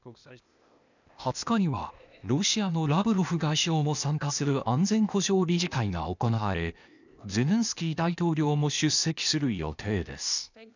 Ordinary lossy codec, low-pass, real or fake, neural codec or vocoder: none; 7.2 kHz; fake; codec, 16 kHz, 0.8 kbps, ZipCodec